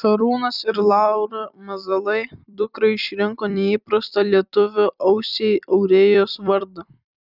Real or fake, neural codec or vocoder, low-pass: fake; vocoder, 44.1 kHz, 128 mel bands every 256 samples, BigVGAN v2; 5.4 kHz